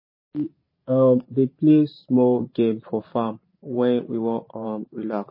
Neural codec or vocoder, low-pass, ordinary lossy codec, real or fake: vocoder, 44.1 kHz, 128 mel bands every 512 samples, BigVGAN v2; 5.4 kHz; MP3, 24 kbps; fake